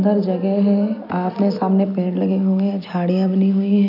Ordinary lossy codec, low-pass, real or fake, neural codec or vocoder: AAC, 48 kbps; 5.4 kHz; real; none